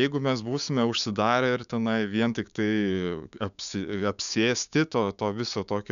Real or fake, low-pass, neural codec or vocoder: fake; 7.2 kHz; codec, 16 kHz, 6 kbps, DAC